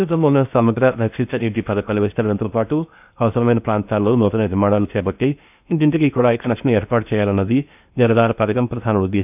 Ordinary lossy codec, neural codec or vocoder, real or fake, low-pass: none; codec, 16 kHz in and 24 kHz out, 0.6 kbps, FocalCodec, streaming, 4096 codes; fake; 3.6 kHz